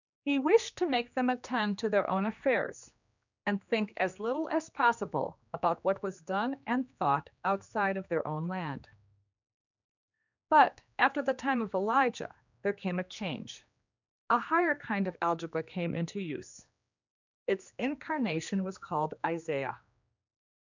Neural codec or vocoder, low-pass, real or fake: codec, 16 kHz, 2 kbps, X-Codec, HuBERT features, trained on general audio; 7.2 kHz; fake